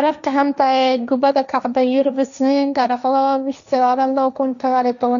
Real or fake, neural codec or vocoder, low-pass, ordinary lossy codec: fake; codec, 16 kHz, 1.1 kbps, Voila-Tokenizer; 7.2 kHz; none